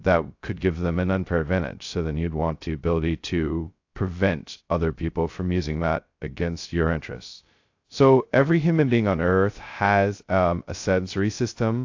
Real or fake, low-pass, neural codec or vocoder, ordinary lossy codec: fake; 7.2 kHz; codec, 16 kHz, 0.2 kbps, FocalCodec; AAC, 48 kbps